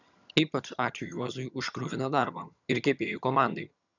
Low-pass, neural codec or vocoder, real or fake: 7.2 kHz; vocoder, 22.05 kHz, 80 mel bands, HiFi-GAN; fake